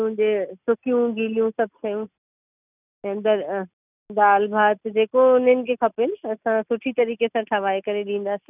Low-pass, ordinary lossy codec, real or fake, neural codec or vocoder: 3.6 kHz; none; real; none